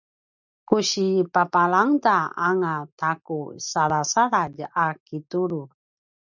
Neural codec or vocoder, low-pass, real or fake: none; 7.2 kHz; real